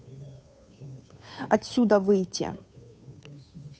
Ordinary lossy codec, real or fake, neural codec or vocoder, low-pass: none; fake; codec, 16 kHz, 2 kbps, FunCodec, trained on Chinese and English, 25 frames a second; none